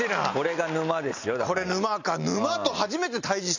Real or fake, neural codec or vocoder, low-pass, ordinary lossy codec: real; none; 7.2 kHz; none